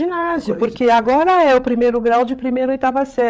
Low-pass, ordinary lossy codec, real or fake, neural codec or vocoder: none; none; fake; codec, 16 kHz, 8 kbps, FreqCodec, larger model